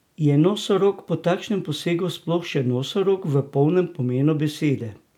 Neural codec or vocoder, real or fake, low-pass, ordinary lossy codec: none; real; 19.8 kHz; none